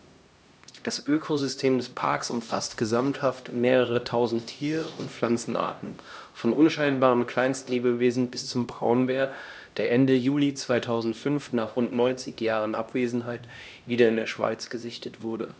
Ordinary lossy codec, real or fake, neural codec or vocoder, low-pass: none; fake; codec, 16 kHz, 1 kbps, X-Codec, HuBERT features, trained on LibriSpeech; none